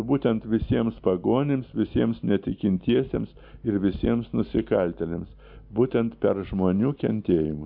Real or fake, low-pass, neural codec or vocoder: real; 5.4 kHz; none